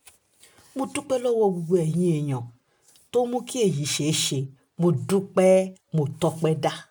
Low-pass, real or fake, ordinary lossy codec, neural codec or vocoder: none; real; none; none